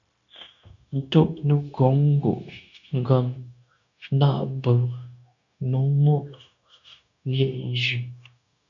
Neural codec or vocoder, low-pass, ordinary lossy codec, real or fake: codec, 16 kHz, 0.9 kbps, LongCat-Audio-Codec; 7.2 kHz; MP3, 96 kbps; fake